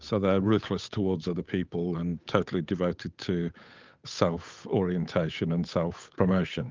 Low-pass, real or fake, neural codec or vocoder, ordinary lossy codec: 7.2 kHz; fake; codec, 16 kHz, 8 kbps, FunCodec, trained on Chinese and English, 25 frames a second; Opus, 24 kbps